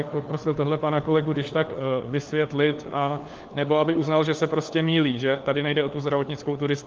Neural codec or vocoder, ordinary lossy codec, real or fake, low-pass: codec, 16 kHz, 4 kbps, FunCodec, trained on LibriTTS, 50 frames a second; Opus, 32 kbps; fake; 7.2 kHz